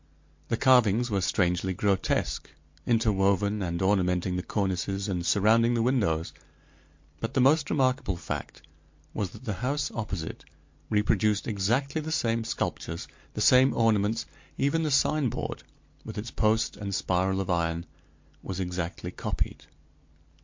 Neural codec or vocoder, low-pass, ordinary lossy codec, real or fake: none; 7.2 kHz; MP3, 48 kbps; real